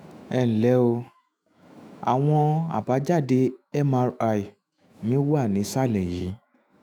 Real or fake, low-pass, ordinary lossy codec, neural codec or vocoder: fake; none; none; autoencoder, 48 kHz, 128 numbers a frame, DAC-VAE, trained on Japanese speech